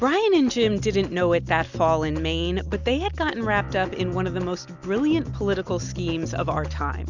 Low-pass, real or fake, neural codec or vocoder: 7.2 kHz; real; none